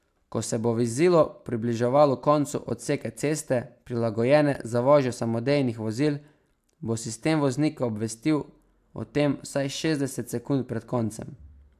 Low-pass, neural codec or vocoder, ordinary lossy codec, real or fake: 14.4 kHz; none; AAC, 96 kbps; real